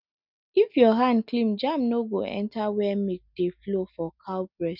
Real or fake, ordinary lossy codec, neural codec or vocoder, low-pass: real; none; none; 5.4 kHz